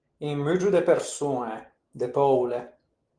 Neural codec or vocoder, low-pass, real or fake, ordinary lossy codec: none; 9.9 kHz; real; Opus, 24 kbps